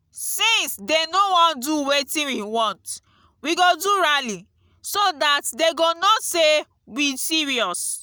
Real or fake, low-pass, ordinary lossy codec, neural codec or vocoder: real; none; none; none